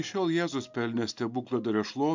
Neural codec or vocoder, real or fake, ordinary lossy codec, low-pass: none; real; MP3, 64 kbps; 7.2 kHz